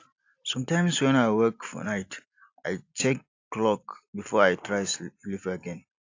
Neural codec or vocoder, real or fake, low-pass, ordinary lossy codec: none; real; 7.2 kHz; AAC, 48 kbps